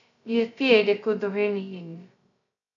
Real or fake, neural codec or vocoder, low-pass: fake; codec, 16 kHz, 0.2 kbps, FocalCodec; 7.2 kHz